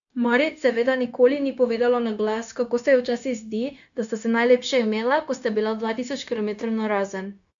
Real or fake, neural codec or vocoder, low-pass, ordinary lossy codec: fake; codec, 16 kHz, 0.9 kbps, LongCat-Audio-Codec; 7.2 kHz; none